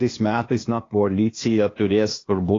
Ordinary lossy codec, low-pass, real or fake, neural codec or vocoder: AAC, 32 kbps; 7.2 kHz; fake; codec, 16 kHz, 0.8 kbps, ZipCodec